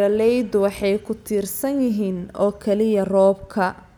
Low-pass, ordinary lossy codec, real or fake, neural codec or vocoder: 19.8 kHz; none; real; none